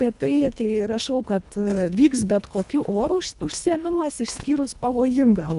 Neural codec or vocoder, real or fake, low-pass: codec, 24 kHz, 1.5 kbps, HILCodec; fake; 10.8 kHz